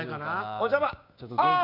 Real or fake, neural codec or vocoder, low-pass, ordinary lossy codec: fake; codec, 16 kHz, 6 kbps, DAC; 5.4 kHz; none